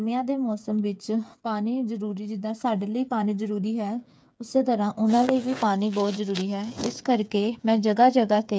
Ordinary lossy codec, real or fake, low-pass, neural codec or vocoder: none; fake; none; codec, 16 kHz, 8 kbps, FreqCodec, smaller model